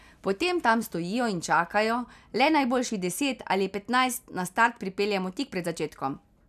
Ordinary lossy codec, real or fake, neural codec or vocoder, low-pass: none; real; none; 14.4 kHz